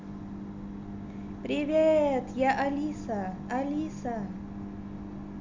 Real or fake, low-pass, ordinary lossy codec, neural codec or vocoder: real; 7.2 kHz; MP3, 64 kbps; none